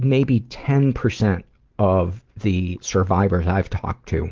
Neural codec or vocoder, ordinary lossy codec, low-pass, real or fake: none; Opus, 16 kbps; 7.2 kHz; real